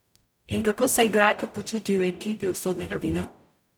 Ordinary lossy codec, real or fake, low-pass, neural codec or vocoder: none; fake; none; codec, 44.1 kHz, 0.9 kbps, DAC